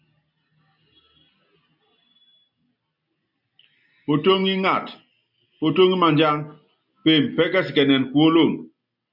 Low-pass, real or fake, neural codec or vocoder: 5.4 kHz; real; none